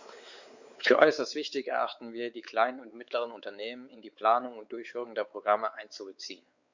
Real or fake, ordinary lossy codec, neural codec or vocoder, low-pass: fake; Opus, 64 kbps; codec, 16 kHz, 4 kbps, X-Codec, WavLM features, trained on Multilingual LibriSpeech; 7.2 kHz